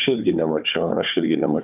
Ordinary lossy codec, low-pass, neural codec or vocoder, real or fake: AAC, 32 kbps; 3.6 kHz; codec, 16 kHz, 4 kbps, FunCodec, trained on Chinese and English, 50 frames a second; fake